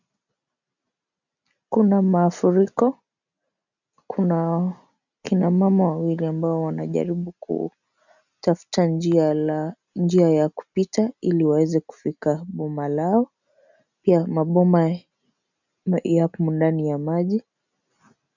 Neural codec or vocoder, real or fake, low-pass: none; real; 7.2 kHz